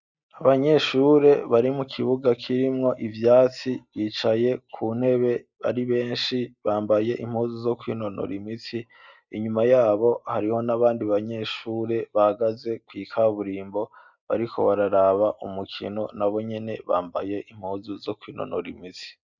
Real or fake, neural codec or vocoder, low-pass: real; none; 7.2 kHz